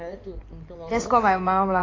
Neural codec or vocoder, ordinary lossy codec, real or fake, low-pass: codec, 16 kHz in and 24 kHz out, 2.2 kbps, FireRedTTS-2 codec; AAC, 32 kbps; fake; 7.2 kHz